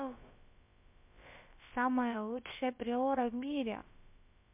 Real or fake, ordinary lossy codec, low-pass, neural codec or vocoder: fake; MP3, 32 kbps; 3.6 kHz; codec, 16 kHz, about 1 kbps, DyCAST, with the encoder's durations